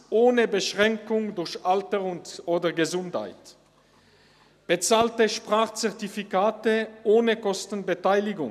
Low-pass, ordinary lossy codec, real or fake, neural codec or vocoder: 14.4 kHz; none; real; none